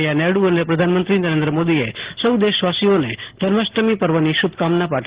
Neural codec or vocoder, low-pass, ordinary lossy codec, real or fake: none; 3.6 kHz; Opus, 16 kbps; real